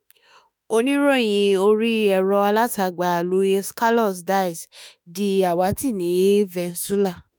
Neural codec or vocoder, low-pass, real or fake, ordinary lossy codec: autoencoder, 48 kHz, 32 numbers a frame, DAC-VAE, trained on Japanese speech; none; fake; none